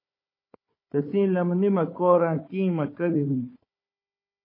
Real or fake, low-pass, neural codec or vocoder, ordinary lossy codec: fake; 5.4 kHz; codec, 16 kHz, 4 kbps, FunCodec, trained on Chinese and English, 50 frames a second; MP3, 24 kbps